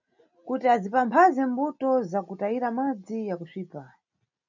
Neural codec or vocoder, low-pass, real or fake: none; 7.2 kHz; real